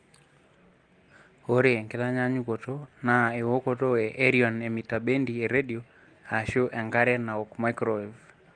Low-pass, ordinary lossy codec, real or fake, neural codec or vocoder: 9.9 kHz; Opus, 24 kbps; real; none